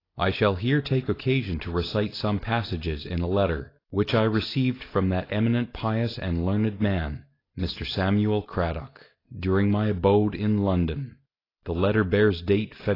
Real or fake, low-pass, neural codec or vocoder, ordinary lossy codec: real; 5.4 kHz; none; AAC, 24 kbps